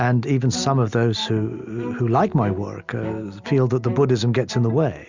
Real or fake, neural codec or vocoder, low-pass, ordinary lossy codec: real; none; 7.2 kHz; Opus, 64 kbps